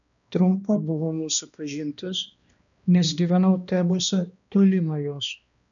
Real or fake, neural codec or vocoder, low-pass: fake; codec, 16 kHz, 1 kbps, X-Codec, HuBERT features, trained on balanced general audio; 7.2 kHz